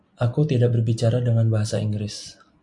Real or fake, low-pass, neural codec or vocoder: real; 10.8 kHz; none